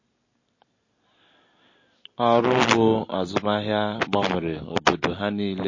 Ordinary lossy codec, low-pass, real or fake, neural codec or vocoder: MP3, 32 kbps; 7.2 kHz; real; none